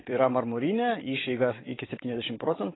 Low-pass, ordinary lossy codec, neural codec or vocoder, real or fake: 7.2 kHz; AAC, 16 kbps; vocoder, 44.1 kHz, 128 mel bands every 256 samples, BigVGAN v2; fake